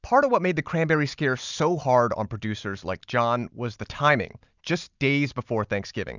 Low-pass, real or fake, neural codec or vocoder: 7.2 kHz; real; none